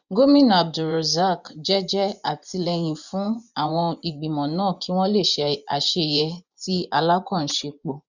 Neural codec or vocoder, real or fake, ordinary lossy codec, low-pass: vocoder, 24 kHz, 100 mel bands, Vocos; fake; none; 7.2 kHz